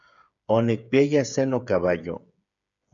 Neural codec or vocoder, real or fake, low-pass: codec, 16 kHz, 8 kbps, FreqCodec, smaller model; fake; 7.2 kHz